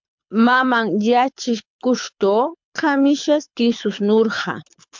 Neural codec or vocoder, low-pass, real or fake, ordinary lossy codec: codec, 24 kHz, 6 kbps, HILCodec; 7.2 kHz; fake; MP3, 64 kbps